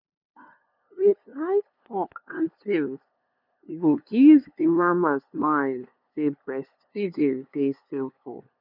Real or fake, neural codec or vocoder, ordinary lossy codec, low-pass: fake; codec, 16 kHz, 2 kbps, FunCodec, trained on LibriTTS, 25 frames a second; none; 5.4 kHz